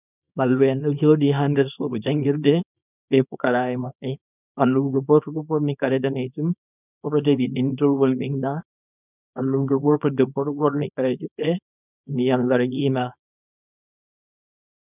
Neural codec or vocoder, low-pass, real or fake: codec, 24 kHz, 0.9 kbps, WavTokenizer, small release; 3.6 kHz; fake